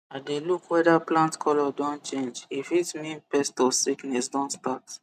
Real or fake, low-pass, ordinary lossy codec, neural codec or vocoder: real; 14.4 kHz; none; none